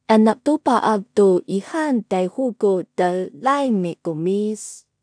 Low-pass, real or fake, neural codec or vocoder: 9.9 kHz; fake; codec, 16 kHz in and 24 kHz out, 0.4 kbps, LongCat-Audio-Codec, two codebook decoder